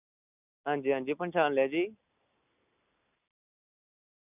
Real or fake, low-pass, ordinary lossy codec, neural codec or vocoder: real; 3.6 kHz; none; none